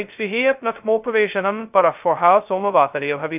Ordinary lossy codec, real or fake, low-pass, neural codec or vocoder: none; fake; 3.6 kHz; codec, 16 kHz, 0.2 kbps, FocalCodec